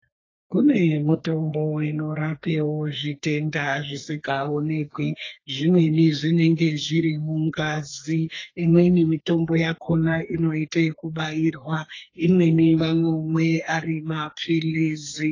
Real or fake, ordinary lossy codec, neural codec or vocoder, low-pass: fake; AAC, 32 kbps; codec, 32 kHz, 1.9 kbps, SNAC; 7.2 kHz